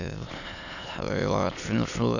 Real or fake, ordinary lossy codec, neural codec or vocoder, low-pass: fake; none; autoencoder, 22.05 kHz, a latent of 192 numbers a frame, VITS, trained on many speakers; 7.2 kHz